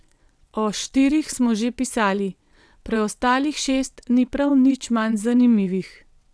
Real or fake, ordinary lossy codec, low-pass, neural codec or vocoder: fake; none; none; vocoder, 22.05 kHz, 80 mel bands, WaveNeXt